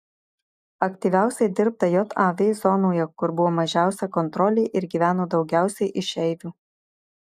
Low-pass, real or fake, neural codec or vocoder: 14.4 kHz; real; none